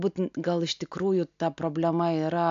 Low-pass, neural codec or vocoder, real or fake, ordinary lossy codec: 7.2 kHz; none; real; AAC, 64 kbps